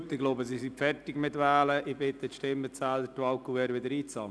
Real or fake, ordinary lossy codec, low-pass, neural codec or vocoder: real; none; none; none